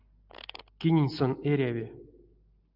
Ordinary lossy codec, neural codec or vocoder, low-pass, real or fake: Opus, 64 kbps; none; 5.4 kHz; real